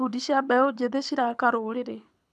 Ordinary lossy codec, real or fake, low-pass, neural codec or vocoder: none; fake; none; codec, 24 kHz, 6 kbps, HILCodec